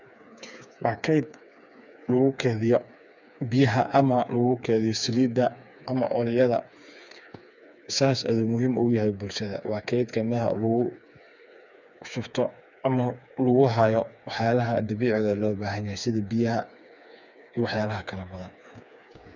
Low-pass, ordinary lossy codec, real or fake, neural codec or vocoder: 7.2 kHz; none; fake; codec, 16 kHz, 4 kbps, FreqCodec, smaller model